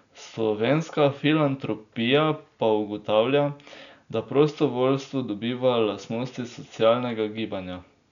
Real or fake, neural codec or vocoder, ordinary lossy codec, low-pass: real; none; none; 7.2 kHz